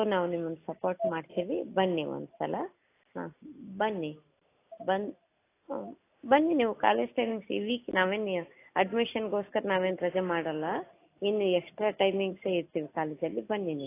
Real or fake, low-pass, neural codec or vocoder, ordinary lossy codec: real; 3.6 kHz; none; AAC, 24 kbps